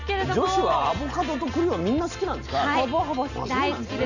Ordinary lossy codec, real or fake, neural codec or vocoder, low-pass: none; real; none; 7.2 kHz